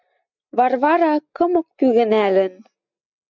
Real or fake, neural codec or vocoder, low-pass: real; none; 7.2 kHz